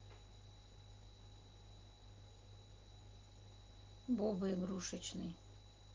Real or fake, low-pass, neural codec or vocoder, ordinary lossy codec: real; 7.2 kHz; none; Opus, 16 kbps